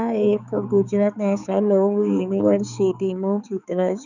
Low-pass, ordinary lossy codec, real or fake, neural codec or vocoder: 7.2 kHz; none; fake; codec, 16 kHz, 4 kbps, X-Codec, HuBERT features, trained on balanced general audio